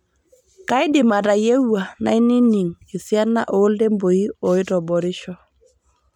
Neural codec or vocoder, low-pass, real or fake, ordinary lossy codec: none; 19.8 kHz; real; MP3, 96 kbps